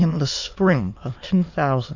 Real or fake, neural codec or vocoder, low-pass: fake; autoencoder, 22.05 kHz, a latent of 192 numbers a frame, VITS, trained on many speakers; 7.2 kHz